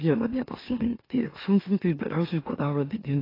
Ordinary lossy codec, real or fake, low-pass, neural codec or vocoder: AAC, 24 kbps; fake; 5.4 kHz; autoencoder, 44.1 kHz, a latent of 192 numbers a frame, MeloTTS